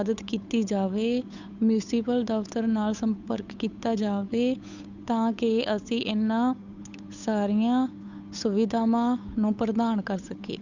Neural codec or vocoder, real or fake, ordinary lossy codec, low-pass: codec, 16 kHz, 8 kbps, FunCodec, trained on Chinese and English, 25 frames a second; fake; none; 7.2 kHz